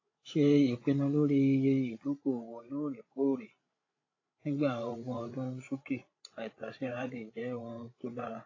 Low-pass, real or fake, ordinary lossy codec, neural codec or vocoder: 7.2 kHz; fake; AAC, 32 kbps; codec, 16 kHz, 16 kbps, FreqCodec, larger model